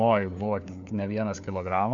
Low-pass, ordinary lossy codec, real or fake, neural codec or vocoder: 7.2 kHz; MP3, 64 kbps; fake; codec, 16 kHz, 4 kbps, FunCodec, trained on LibriTTS, 50 frames a second